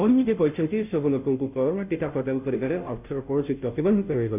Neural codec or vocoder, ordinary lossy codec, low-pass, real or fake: codec, 16 kHz, 0.5 kbps, FunCodec, trained on Chinese and English, 25 frames a second; none; 3.6 kHz; fake